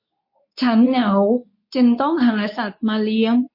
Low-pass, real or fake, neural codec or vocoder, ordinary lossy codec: 5.4 kHz; fake; codec, 24 kHz, 0.9 kbps, WavTokenizer, medium speech release version 2; MP3, 32 kbps